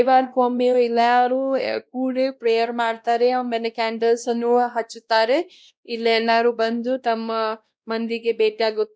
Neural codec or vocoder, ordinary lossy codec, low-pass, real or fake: codec, 16 kHz, 1 kbps, X-Codec, WavLM features, trained on Multilingual LibriSpeech; none; none; fake